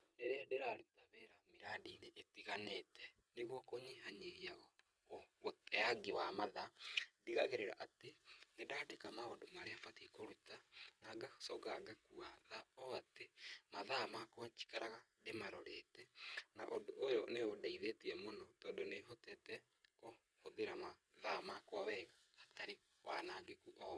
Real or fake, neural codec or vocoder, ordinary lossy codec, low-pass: fake; vocoder, 22.05 kHz, 80 mel bands, WaveNeXt; none; none